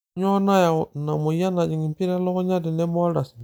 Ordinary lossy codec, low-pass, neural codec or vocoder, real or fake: none; none; none; real